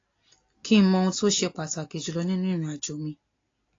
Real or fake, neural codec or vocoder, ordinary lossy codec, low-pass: real; none; AAC, 32 kbps; 7.2 kHz